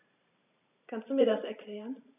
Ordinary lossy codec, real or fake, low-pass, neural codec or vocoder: none; fake; 3.6 kHz; vocoder, 44.1 kHz, 128 mel bands every 512 samples, BigVGAN v2